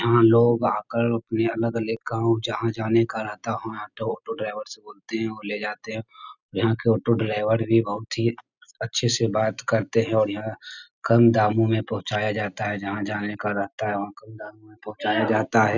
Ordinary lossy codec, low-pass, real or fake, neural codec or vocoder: none; 7.2 kHz; real; none